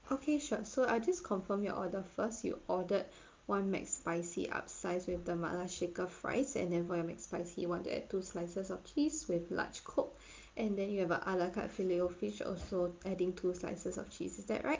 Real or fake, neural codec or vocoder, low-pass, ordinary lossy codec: real; none; 7.2 kHz; Opus, 32 kbps